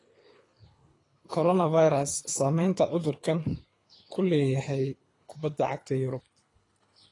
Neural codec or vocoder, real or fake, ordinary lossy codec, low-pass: codec, 24 kHz, 3 kbps, HILCodec; fake; AAC, 32 kbps; 10.8 kHz